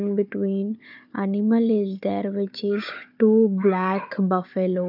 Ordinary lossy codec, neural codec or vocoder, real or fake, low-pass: none; codec, 16 kHz, 4 kbps, FunCodec, trained on Chinese and English, 50 frames a second; fake; 5.4 kHz